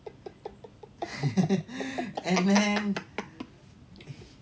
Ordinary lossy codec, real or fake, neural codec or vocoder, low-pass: none; real; none; none